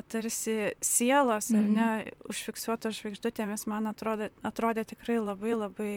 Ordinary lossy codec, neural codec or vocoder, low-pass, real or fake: MP3, 96 kbps; vocoder, 44.1 kHz, 128 mel bands, Pupu-Vocoder; 19.8 kHz; fake